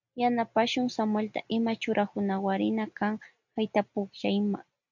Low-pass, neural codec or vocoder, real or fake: 7.2 kHz; none; real